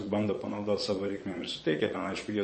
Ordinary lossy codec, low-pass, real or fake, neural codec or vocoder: MP3, 32 kbps; 9.9 kHz; fake; vocoder, 22.05 kHz, 80 mel bands, Vocos